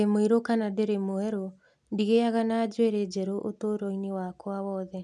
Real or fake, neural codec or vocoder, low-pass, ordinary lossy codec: real; none; none; none